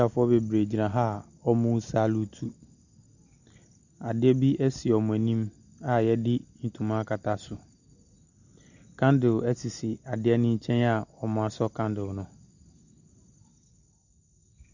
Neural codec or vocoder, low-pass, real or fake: none; 7.2 kHz; real